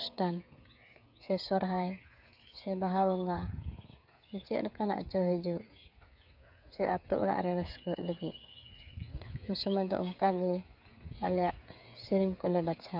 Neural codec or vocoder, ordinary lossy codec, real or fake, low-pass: codec, 16 kHz, 8 kbps, FreqCodec, smaller model; none; fake; 5.4 kHz